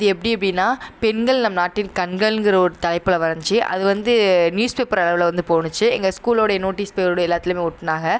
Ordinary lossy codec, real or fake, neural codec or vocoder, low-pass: none; real; none; none